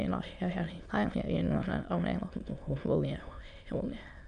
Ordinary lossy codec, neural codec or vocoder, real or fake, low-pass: none; autoencoder, 22.05 kHz, a latent of 192 numbers a frame, VITS, trained on many speakers; fake; 9.9 kHz